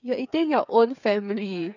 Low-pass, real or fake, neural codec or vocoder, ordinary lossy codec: 7.2 kHz; fake; codec, 16 kHz, 8 kbps, FreqCodec, smaller model; none